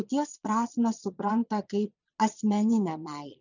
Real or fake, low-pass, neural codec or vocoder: fake; 7.2 kHz; vocoder, 22.05 kHz, 80 mel bands, Vocos